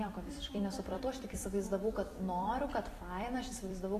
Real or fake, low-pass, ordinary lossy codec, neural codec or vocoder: fake; 14.4 kHz; AAC, 48 kbps; vocoder, 44.1 kHz, 128 mel bands every 256 samples, BigVGAN v2